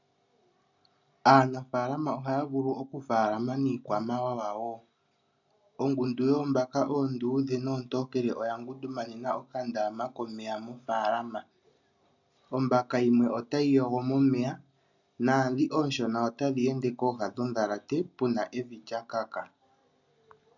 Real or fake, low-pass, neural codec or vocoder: real; 7.2 kHz; none